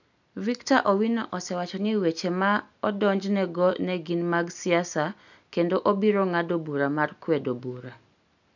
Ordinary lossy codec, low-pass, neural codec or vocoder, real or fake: none; 7.2 kHz; none; real